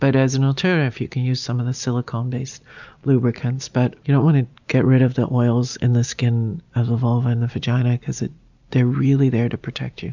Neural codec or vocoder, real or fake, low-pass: none; real; 7.2 kHz